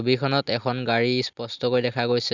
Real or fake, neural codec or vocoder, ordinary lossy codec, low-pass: real; none; none; 7.2 kHz